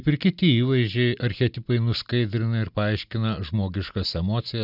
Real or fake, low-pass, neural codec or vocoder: real; 5.4 kHz; none